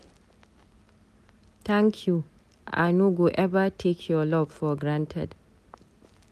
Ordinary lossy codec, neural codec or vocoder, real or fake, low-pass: MP3, 96 kbps; none; real; 14.4 kHz